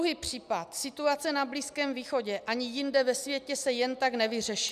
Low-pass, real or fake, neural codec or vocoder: 14.4 kHz; real; none